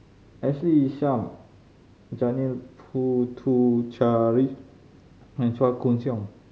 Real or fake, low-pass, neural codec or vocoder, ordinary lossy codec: real; none; none; none